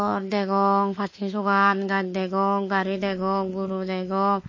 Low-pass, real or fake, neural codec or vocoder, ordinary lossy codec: 7.2 kHz; fake; autoencoder, 48 kHz, 32 numbers a frame, DAC-VAE, trained on Japanese speech; MP3, 32 kbps